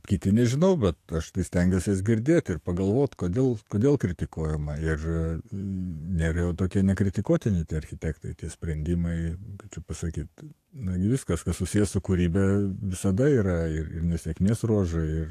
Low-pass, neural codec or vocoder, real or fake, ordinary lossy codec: 14.4 kHz; codec, 44.1 kHz, 7.8 kbps, DAC; fake; AAC, 64 kbps